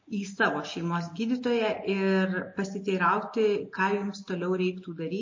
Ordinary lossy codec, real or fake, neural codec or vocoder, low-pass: MP3, 32 kbps; real; none; 7.2 kHz